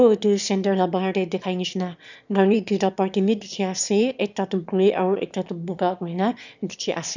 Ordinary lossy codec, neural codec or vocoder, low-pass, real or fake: none; autoencoder, 22.05 kHz, a latent of 192 numbers a frame, VITS, trained on one speaker; 7.2 kHz; fake